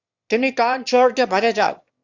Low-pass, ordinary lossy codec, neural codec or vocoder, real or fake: 7.2 kHz; Opus, 64 kbps; autoencoder, 22.05 kHz, a latent of 192 numbers a frame, VITS, trained on one speaker; fake